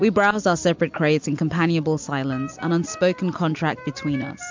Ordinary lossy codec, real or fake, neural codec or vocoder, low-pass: MP3, 64 kbps; real; none; 7.2 kHz